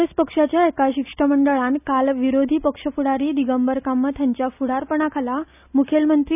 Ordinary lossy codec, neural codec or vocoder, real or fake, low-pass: none; none; real; 3.6 kHz